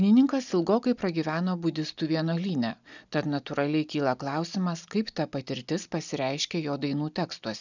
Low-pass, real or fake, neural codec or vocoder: 7.2 kHz; real; none